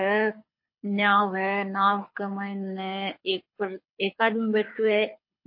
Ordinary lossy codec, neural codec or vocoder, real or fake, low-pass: MP3, 32 kbps; codec, 24 kHz, 6 kbps, HILCodec; fake; 5.4 kHz